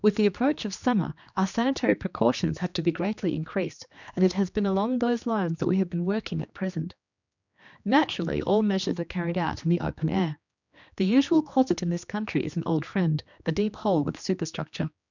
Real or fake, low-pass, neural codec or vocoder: fake; 7.2 kHz; codec, 16 kHz, 2 kbps, X-Codec, HuBERT features, trained on general audio